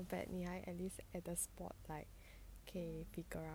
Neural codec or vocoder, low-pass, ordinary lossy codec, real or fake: none; none; none; real